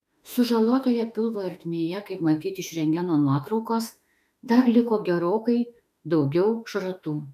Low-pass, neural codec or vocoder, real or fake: 14.4 kHz; autoencoder, 48 kHz, 32 numbers a frame, DAC-VAE, trained on Japanese speech; fake